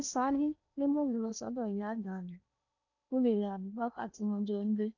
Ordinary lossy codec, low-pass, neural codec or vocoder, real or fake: none; 7.2 kHz; codec, 16 kHz in and 24 kHz out, 0.8 kbps, FocalCodec, streaming, 65536 codes; fake